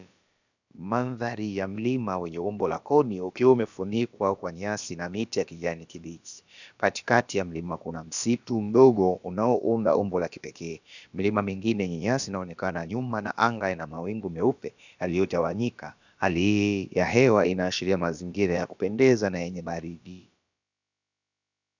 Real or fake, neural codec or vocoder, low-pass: fake; codec, 16 kHz, about 1 kbps, DyCAST, with the encoder's durations; 7.2 kHz